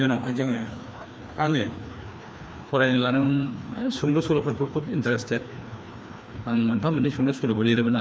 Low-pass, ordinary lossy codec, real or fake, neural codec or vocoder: none; none; fake; codec, 16 kHz, 2 kbps, FreqCodec, larger model